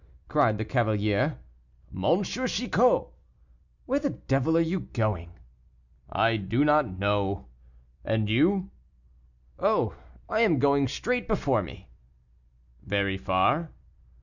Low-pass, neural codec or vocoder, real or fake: 7.2 kHz; none; real